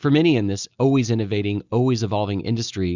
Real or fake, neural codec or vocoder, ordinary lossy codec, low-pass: real; none; Opus, 64 kbps; 7.2 kHz